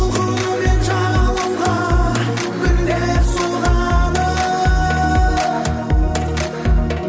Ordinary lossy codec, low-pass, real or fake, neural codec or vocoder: none; none; real; none